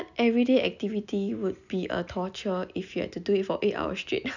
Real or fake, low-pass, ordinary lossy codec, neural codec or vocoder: real; 7.2 kHz; none; none